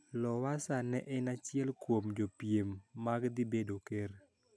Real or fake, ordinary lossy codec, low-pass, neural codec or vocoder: real; none; none; none